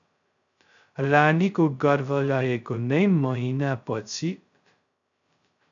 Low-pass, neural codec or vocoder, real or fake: 7.2 kHz; codec, 16 kHz, 0.2 kbps, FocalCodec; fake